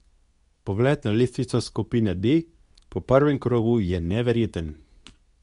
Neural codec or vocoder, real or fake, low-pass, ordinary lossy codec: codec, 24 kHz, 0.9 kbps, WavTokenizer, medium speech release version 2; fake; 10.8 kHz; none